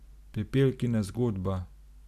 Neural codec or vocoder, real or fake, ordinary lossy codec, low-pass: none; real; none; 14.4 kHz